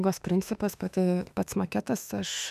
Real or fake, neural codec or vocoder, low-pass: fake; autoencoder, 48 kHz, 32 numbers a frame, DAC-VAE, trained on Japanese speech; 14.4 kHz